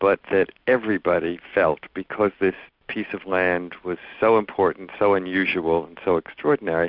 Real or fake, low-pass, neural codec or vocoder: real; 5.4 kHz; none